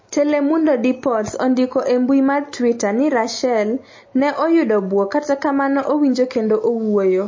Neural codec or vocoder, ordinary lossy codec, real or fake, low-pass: none; MP3, 32 kbps; real; 7.2 kHz